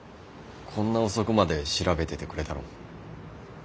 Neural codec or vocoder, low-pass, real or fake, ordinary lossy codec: none; none; real; none